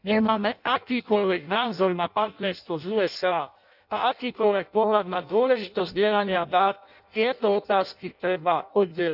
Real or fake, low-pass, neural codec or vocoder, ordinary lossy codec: fake; 5.4 kHz; codec, 16 kHz in and 24 kHz out, 0.6 kbps, FireRedTTS-2 codec; none